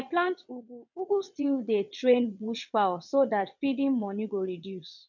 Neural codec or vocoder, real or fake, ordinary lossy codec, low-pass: vocoder, 22.05 kHz, 80 mel bands, WaveNeXt; fake; none; 7.2 kHz